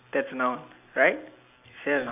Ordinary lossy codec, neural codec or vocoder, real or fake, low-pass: none; none; real; 3.6 kHz